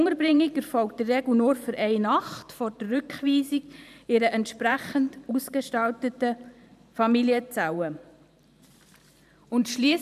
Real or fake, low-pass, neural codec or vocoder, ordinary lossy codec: fake; 14.4 kHz; vocoder, 44.1 kHz, 128 mel bands every 512 samples, BigVGAN v2; none